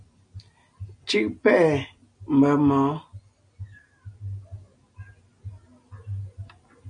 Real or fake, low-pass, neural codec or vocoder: real; 9.9 kHz; none